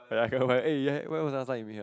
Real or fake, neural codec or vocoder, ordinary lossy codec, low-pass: real; none; none; none